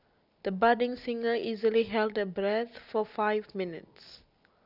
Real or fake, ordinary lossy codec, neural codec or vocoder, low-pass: real; none; none; 5.4 kHz